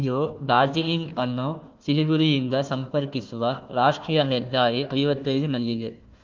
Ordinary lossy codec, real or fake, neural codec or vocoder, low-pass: Opus, 24 kbps; fake; codec, 16 kHz, 1 kbps, FunCodec, trained on Chinese and English, 50 frames a second; 7.2 kHz